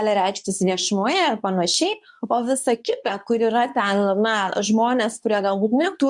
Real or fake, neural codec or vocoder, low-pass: fake; codec, 24 kHz, 0.9 kbps, WavTokenizer, medium speech release version 2; 10.8 kHz